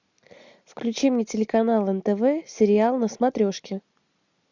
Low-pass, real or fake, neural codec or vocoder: 7.2 kHz; real; none